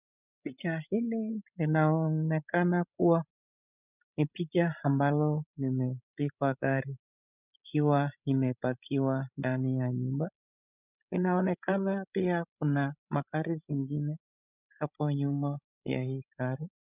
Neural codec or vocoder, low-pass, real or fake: codec, 16 kHz, 16 kbps, FreqCodec, larger model; 3.6 kHz; fake